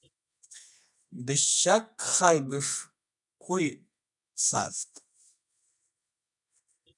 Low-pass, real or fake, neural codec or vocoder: 10.8 kHz; fake; codec, 24 kHz, 0.9 kbps, WavTokenizer, medium music audio release